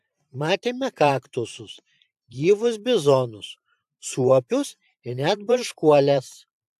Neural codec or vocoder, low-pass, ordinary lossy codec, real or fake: vocoder, 44.1 kHz, 128 mel bands every 512 samples, BigVGAN v2; 14.4 kHz; AAC, 96 kbps; fake